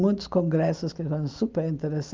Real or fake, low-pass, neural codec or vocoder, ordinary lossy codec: real; 7.2 kHz; none; Opus, 24 kbps